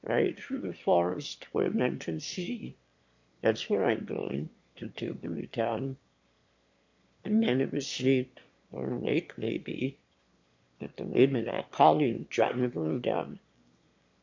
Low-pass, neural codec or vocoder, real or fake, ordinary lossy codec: 7.2 kHz; autoencoder, 22.05 kHz, a latent of 192 numbers a frame, VITS, trained on one speaker; fake; MP3, 48 kbps